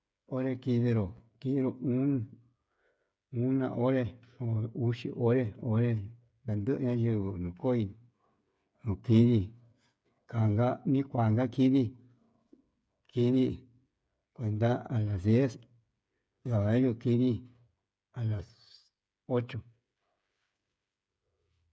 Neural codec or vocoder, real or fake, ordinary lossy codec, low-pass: codec, 16 kHz, 8 kbps, FreqCodec, smaller model; fake; none; none